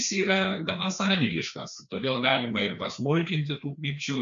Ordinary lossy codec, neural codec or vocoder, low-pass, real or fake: MP3, 64 kbps; codec, 16 kHz, 2 kbps, FreqCodec, larger model; 7.2 kHz; fake